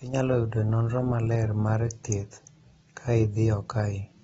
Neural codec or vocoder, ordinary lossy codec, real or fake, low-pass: none; AAC, 24 kbps; real; 9.9 kHz